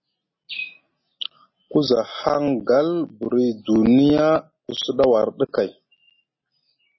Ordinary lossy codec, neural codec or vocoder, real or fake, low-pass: MP3, 24 kbps; none; real; 7.2 kHz